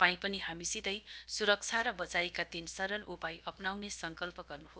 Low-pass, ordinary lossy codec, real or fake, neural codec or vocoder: none; none; fake; codec, 16 kHz, about 1 kbps, DyCAST, with the encoder's durations